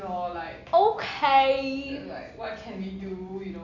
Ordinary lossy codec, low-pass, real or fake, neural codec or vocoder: none; 7.2 kHz; real; none